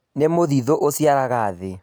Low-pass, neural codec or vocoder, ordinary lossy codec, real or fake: none; none; none; real